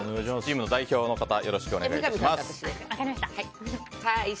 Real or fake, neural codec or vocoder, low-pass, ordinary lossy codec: real; none; none; none